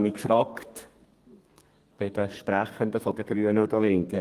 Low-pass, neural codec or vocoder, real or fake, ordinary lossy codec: 14.4 kHz; codec, 32 kHz, 1.9 kbps, SNAC; fake; Opus, 24 kbps